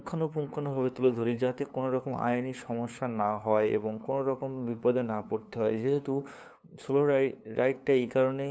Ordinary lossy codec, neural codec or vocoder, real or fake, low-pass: none; codec, 16 kHz, 2 kbps, FunCodec, trained on LibriTTS, 25 frames a second; fake; none